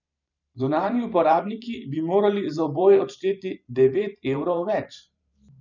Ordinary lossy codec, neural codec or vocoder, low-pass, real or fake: none; vocoder, 44.1 kHz, 128 mel bands every 512 samples, BigVGAN v2; 7.2 kHz; fake